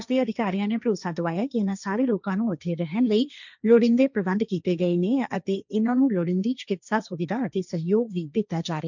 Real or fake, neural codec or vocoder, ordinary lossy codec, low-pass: fake; codec, 16 kHz, 1.1 kbps, Voila-Tokenizer; none; 7.2 kHz